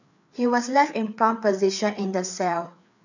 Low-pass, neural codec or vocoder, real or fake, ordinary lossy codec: 7.2 kHz; codec, 16 kHz, 4 kbps, FreqCodec, larger model; fake; none